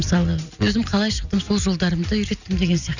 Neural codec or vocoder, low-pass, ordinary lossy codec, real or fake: none; 7.2 kHz; none; real